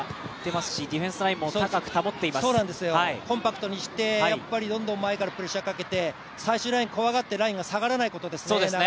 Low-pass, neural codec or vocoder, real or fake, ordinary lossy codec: none; none; real; none